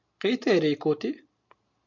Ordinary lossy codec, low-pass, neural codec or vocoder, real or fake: MP3, 64 kbps; 7.2 kHz; none; real